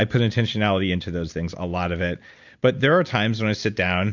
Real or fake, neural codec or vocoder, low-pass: real; none; 7.2 kHz